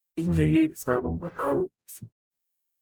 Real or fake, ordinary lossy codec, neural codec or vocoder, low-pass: fake; none; codec, 44.1 kHz, 0.9 kbps, DAC; none